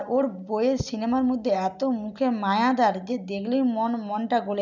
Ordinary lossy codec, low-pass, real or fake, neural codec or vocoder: none; 7.2 kHz; real; none